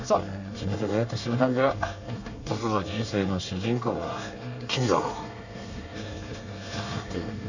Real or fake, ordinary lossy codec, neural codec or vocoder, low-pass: fake; none; codec, 24 kHz, 1 kbps, SNAC; 7.2 kHz